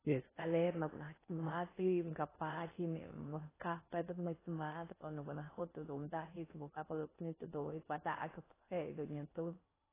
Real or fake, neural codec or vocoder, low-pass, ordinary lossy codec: fake; codec, 16 kHz in and 24 kHz out, 0.6 kbps, FocalCodec, streaming, 4096 codes; 3.6 kHz; AAC, 16 kbps